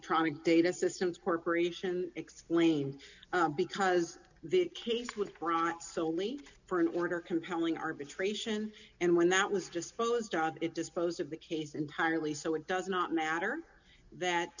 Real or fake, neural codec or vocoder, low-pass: real; none; 7.2 kHz